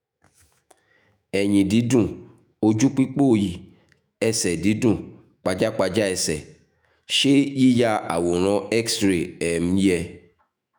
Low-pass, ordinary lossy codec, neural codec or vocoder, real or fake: none; none; autoencoder, 48 kHz, 128 numbers a frame, DAC-VAE, trained on Japanese speech; fake